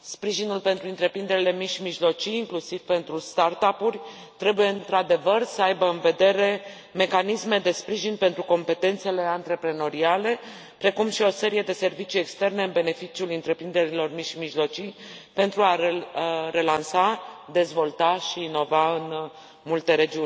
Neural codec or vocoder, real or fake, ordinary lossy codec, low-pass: none; real; none; none